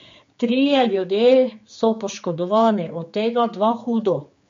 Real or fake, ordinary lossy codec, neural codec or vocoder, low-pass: fake; MP3, 48 kbps; codec, 16 kHz, 4 kbps, X-Codec, HuBERT features, trained on general audio; 7.2 kHz